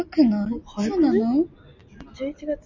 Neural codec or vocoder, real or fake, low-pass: none; real; 7.2 kHz